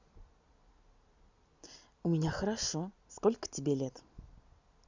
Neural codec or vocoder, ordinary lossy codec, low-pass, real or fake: none; Opus, 64 kbps; 7.2 kHz; real